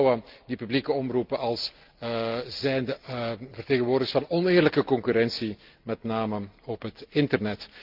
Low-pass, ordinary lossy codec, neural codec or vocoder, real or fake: 5.4 kHz; Opus, 32 kbps; none; real